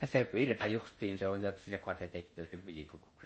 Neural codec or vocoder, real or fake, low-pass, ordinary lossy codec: codec, 16 kHz in and 24 kHz out, 0.6 kbps, FocalCodec, streaming, 4096 codes; fake; 9.9 kHz; MP3, 32 kbps